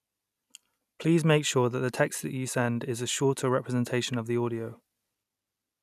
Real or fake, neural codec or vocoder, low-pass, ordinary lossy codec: real; none; 14.4 kHz; none